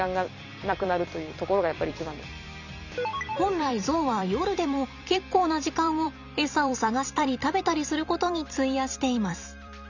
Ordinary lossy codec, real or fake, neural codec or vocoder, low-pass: none; fake; vocoder, 44.1 kHz, 128 mel bands every 256 samples, BigVGAN v2; 7.2 kHz